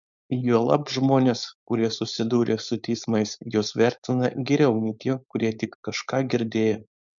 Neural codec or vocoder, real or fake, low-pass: codec, 16 kHz, 4.8 kbps, FACodec; fake; 7.2 kHz